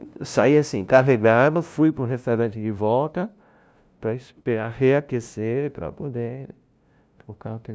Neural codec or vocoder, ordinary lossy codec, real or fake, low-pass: codec, 16 kHz, 0.5 kbps, FunCodec, trained on LibriTTS, 25 frames a second; none; fake; none